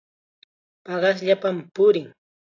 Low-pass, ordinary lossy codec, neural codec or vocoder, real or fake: 7.2 kHz; AAC, 32 kbps; none; real